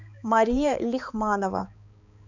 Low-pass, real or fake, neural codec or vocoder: 7.2 kHz; fake; codec, 16 kHz, 4 kbps, X-Codec, HuBERT features, trained on balanced general audio